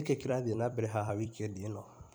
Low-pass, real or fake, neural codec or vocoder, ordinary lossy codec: none; fake; vocoder, 44.1 kHz, 128 mel bands every 256 samples, BigVGAN v2; none